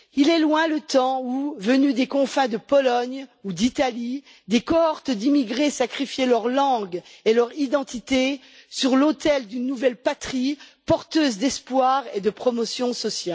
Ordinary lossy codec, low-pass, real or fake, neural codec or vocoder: none; none; real; none